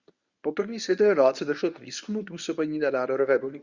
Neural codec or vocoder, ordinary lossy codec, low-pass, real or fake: codec, 24 kHz, 0.9 kbps, WavTokenizer, medium speech release version 1; Opus, 64 kbps; 7.2 kHz; fake